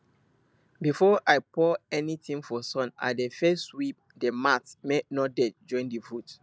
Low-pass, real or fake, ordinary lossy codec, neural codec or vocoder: none; real; none; none